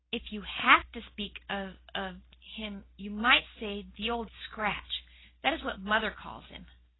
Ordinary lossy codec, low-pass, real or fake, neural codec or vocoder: AAC, 16 kbps; 7.2 kHz; real; none